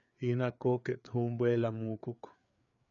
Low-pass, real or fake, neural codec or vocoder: 7.2 kHz; fake; codec, 16 kHz, 4 kbps, FreqCodec, larger model